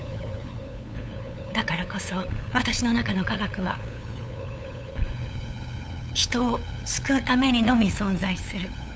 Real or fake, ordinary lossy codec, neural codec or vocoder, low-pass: fake; none; codec, 16 kHz, 8 kbps, FunCodec, trained on LibriTTS, 25 frames a second; none